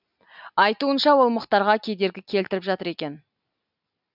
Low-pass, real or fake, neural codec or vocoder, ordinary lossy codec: 5.4 kHz; real; none; none